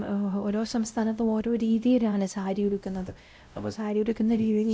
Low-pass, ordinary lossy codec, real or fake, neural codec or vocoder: none; none; fake; codec, 16 kHz, 0.5 kbps, X-Codec, WavLM features, trained on Multilingual LibriSpeech